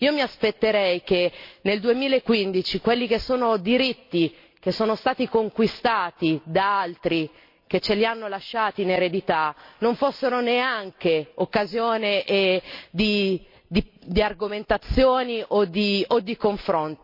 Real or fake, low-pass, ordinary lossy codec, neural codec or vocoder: real; 5.4 kHz; MP3, 32 kbps; none